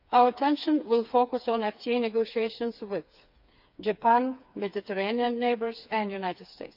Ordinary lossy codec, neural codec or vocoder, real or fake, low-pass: none; codec, 16 kHz, 4 kbps, FreqCodec, smaller model; fake; 5.4 kHz